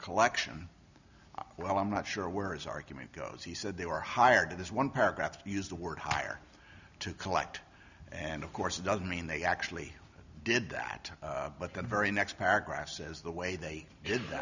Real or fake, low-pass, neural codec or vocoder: real; 7.2 kHz; none